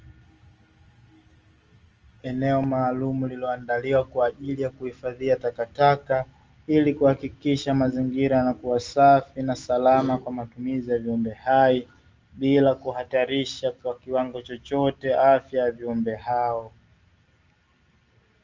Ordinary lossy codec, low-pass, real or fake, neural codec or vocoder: Opus, 24 kbps; 7.2 kHz; real; none